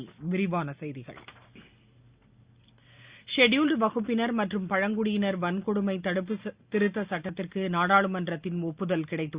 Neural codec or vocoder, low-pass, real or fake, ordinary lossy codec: none; 3.6 kHz; real; Opus, 64 kbps